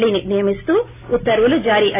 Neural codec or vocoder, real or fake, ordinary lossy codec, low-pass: none; real; AAC, 16 kbps; 3.6 kHz